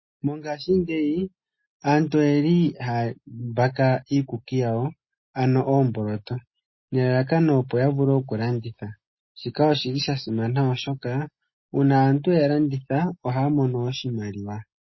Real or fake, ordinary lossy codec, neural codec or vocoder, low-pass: real; MP3, 24 kbps; none; 7.2 kHz